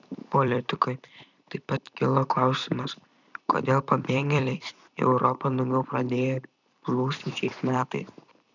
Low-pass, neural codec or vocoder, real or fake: 7.2 kHz; vocoder, 44.1 kHz, 128 mel bands every 256 samples, BigVGAN v2; fake